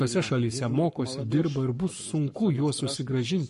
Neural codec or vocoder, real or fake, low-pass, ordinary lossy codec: codec, 44.1 kHz, 7.8 kbps, Pupu-Codec; fake; 14.4 kHz; MP3, 48 kbps